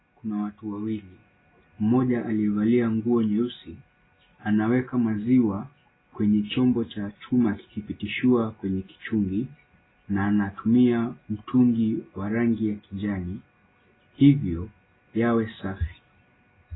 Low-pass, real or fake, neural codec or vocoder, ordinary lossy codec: 7.2 kHz; real; none; AAC, 16 kbps